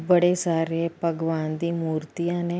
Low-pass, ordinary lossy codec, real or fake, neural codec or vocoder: none; none; real; none